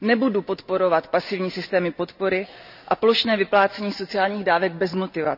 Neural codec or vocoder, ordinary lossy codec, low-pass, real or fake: none; none; 5.4 kHz; real